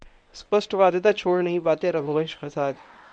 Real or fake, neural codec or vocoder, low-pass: fake; codec, 24 kHz, 0.9 kbps, WavTokenizer, medium speech release version 1; 9.9 kHz